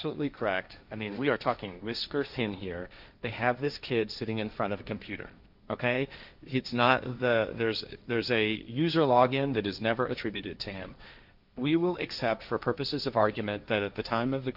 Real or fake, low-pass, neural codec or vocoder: fake; 5.4 kHz; codec, 16 kHz, 1.1 kbps, Voila-Tokenizer